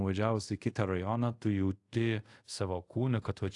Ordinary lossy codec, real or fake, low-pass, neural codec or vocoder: AAC, 48 kbps; fake; 10.8 kHz; codec, 24 kHz, 0.5 kbps, DualCodec